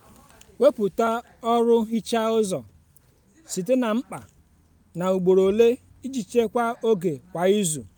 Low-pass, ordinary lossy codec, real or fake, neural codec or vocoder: none; none; real; none